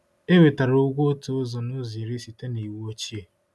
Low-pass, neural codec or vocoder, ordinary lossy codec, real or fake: none; none; none; real